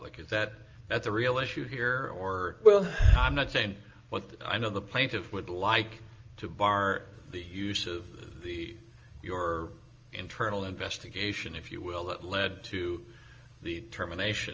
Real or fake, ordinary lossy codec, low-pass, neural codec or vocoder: real; Opus, 24 kbps; 7.2 kHz; none